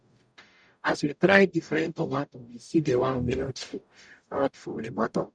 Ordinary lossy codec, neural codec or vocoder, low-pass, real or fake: none; codec, 44.1 kHz, 0.9 kbps, DAC; 9.9 kHz; fake